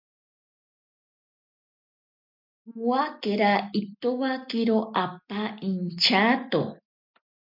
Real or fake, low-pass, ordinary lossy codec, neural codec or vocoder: real; 5.4 kHz; MP3, 48 kbps; none